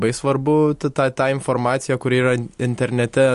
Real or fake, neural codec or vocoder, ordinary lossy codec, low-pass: real; none; MP3, 48 kbps; 14.4 kHz